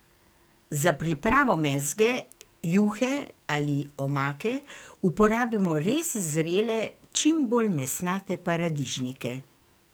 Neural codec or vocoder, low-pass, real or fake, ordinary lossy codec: codec, 44.1 kHz, 2.6 kbps, SNAC; none; fake; none